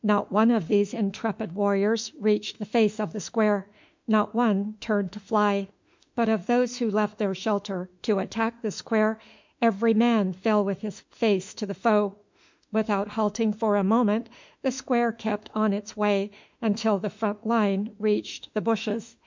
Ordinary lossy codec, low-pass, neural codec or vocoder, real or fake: MP3, 64 kbps; 7.2 kHz; autoencoder, 48 kHz, 32 numbers a frame, DAC-VAE, trained on Japanese speech; fake